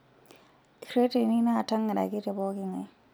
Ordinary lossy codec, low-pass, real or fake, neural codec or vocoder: none; none; real; none